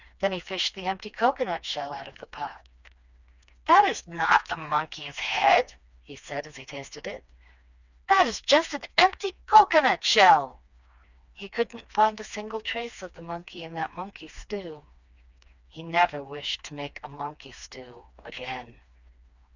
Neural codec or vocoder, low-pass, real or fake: codec, 16 kHz, 2 kbps, FreqCodec, smaller model; 7.2 kHz; fake